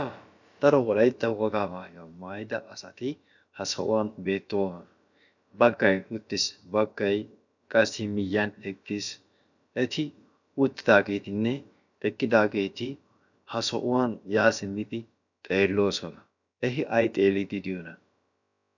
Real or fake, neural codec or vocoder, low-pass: fake; codec, 16 kHz, about 1 kbps, DyCAST, with the encoder's durations; 7.2 kHz